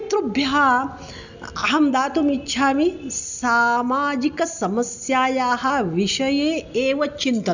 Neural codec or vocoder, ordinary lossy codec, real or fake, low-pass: none; none; real; 7.2 kHz